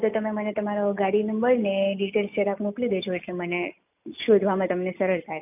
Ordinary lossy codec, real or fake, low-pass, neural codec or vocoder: none; real; 3.6 kHz; none